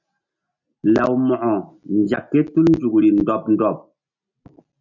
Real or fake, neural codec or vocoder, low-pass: real; none; 7.2 kHz